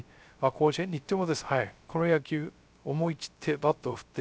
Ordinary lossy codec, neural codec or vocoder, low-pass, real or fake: none; codec, 16 kHz, 0.3 kbps, FocalCodec; none; fake